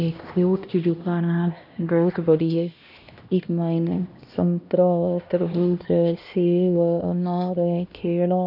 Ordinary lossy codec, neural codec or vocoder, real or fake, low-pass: none; codec, 16 kHz, 1 kbps, X-Codec, HuBERT features, trained on LibriSpeech; fake; 5.4 kHz